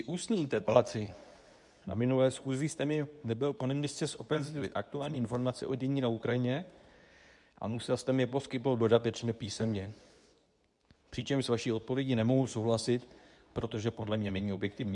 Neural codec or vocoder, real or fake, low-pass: codec, 24 kHz, 0.9 kbps, WavTokenizer, medium speech release version 2; fake; 10.8 kHz